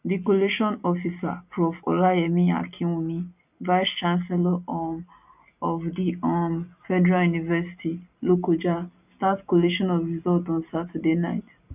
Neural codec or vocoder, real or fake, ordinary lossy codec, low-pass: none; real; none; 3.6 kHz